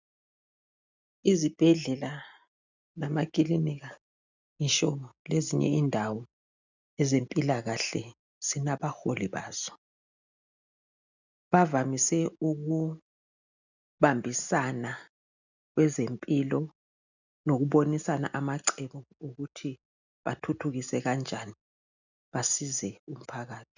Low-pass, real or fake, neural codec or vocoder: 7.2 kHz; real; none